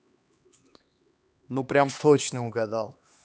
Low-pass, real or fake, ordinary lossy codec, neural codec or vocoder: none; fake; none; codec, 16 kHz, 2 kbps, X-Codec, HuBERT features, trained on LibriSpeech